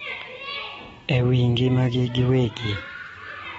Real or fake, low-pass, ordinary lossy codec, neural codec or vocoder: real; 19.8 kHz; AAC, 24 kbps; none